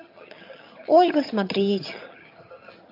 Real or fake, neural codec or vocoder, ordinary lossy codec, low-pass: fake; vocoder, 22.05 kHz, 80 mel bands, HiFi-GAN; MP3, 32 kbps; 5.4 kHz